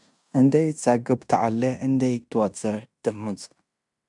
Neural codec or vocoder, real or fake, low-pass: codec, 16 kHz in and 24 kHz out, 0.9 kbps, LongCat-Audio-Codec, fine tuned four codebook decoder; fake; 10.8 kHz